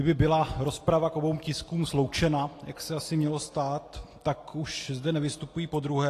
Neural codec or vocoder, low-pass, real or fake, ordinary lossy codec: none; 14.4 kHz; real; AAC, 48 kbps